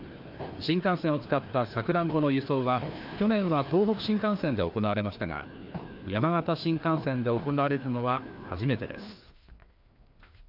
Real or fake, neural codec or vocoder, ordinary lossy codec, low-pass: fake; codec, 16 kHz, 2 kbps, FreqCodec, larger model; AAC, 48 kbps; 5.4 kHz